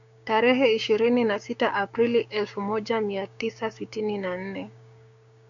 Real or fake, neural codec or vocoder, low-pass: fake; codec, 16 kHz, 6 kbps, DAC; 7.2 kHz